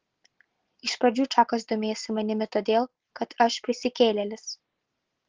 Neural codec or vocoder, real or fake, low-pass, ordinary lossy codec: none; real; 7.2 kHz; Opus, 16 kbps